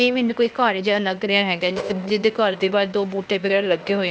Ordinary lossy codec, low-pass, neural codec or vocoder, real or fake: none; none; codec, 16 kHz, 0.8 kbps, ZipCodec; fake